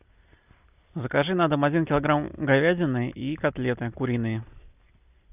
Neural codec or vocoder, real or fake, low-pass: none; real; 3.6 kHz